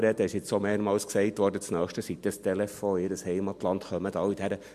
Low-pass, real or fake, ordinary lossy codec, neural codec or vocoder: 14.4 kHz; real; MP3, 64 kbps; none